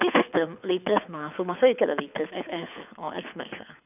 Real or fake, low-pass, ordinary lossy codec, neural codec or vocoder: fake; 3.6 kHz; none; codec, 24 kHz, 6 kbps, HILCodec